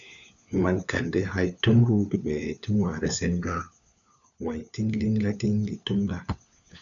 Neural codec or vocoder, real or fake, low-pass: codec, 16 kHz, 4 kbps, FunCodec, trained on LibriTTS, 50 frames a second; fake; 7.2 kHz